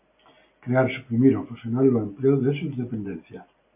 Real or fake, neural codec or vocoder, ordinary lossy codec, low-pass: real; none; MP3, 24 kbps; 3.6 kHz